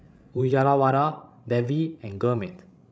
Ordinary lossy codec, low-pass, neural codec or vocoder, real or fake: none; none; codec, 16 kHz, 16 kbps, FreqCodec, larger model; fake